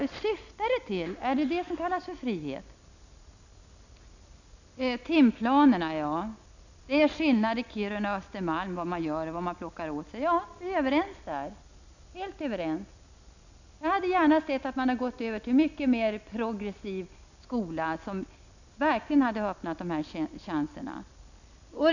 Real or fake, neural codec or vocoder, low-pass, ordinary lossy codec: real; none; 7.2 kHz; none